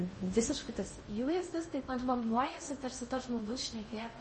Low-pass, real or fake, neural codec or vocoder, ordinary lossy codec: 10.8 kHz; fake; codec, 16 kHz in and 24 kHz out, 0.6 kbps, FocalCodec, streaming, 2048 codes; MP3, 32 kbps